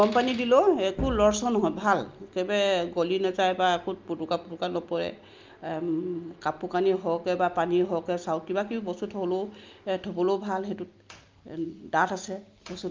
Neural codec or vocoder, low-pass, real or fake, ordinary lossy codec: none; 7.2 kHz; real; Opus, 24 kbps